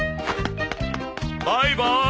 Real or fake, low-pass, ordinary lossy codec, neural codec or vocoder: real; none; none; none